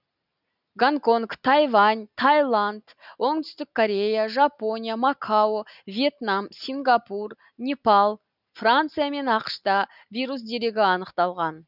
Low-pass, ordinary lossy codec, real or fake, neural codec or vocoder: 5.4 kHz; none; real; none